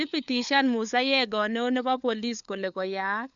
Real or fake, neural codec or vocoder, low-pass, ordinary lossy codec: fake; codec, 16 kHz, 4 kbps, FunCodec, trained on LibriTTS, 50 frames a second; 7.2 kHz; MP3, 96 kbps